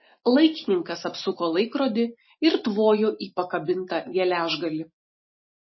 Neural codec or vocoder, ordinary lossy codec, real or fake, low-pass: none; MP3, 24 kbps; real; 7.2 kHz